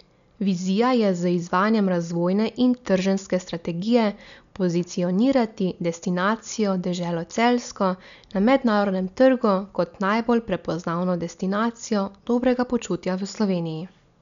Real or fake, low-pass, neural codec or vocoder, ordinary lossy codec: real; 7.2 kHz; none; none